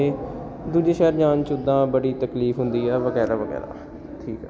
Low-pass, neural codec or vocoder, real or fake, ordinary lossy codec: none; none; real; none